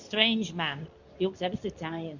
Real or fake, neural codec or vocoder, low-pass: fake; codec, 24 kHz, 0.9 kbps, WavTokenizer, medium speech release version 2; 7.2 kHz